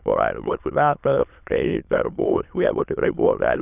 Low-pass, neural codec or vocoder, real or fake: 3.6 kHz; autoencoder, 22.05 kHz, a latent of 192 numbers a frame, VITS, trained on many speakers; fake